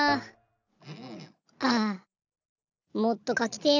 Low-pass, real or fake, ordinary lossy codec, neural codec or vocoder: 7.2 kHz; real; none; none